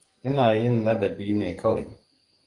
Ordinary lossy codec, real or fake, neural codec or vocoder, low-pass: Opus, 32 kbps; fake; codec, 44.1 kHz, 2.6 kbps, SNAC; 10.8 kHz